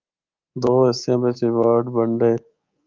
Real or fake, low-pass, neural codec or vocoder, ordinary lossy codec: fake; 7.2 kHz; codec, 24 kHz, 3.1 kbps, DualCodec; Opus, 24 kbps